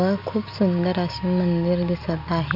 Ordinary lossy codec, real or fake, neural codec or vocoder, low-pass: none; real; none; 5.4 kHz